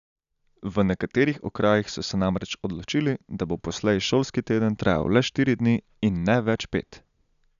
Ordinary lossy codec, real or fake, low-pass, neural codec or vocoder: none; real; 7.2 kHz; none